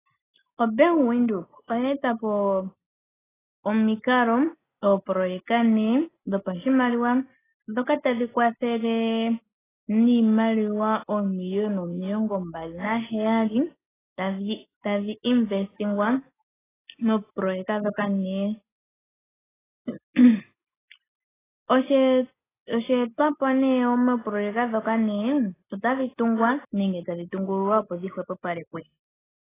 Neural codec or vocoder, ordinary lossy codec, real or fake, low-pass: none; AAC, 16 kbps; real; 3.6 kHz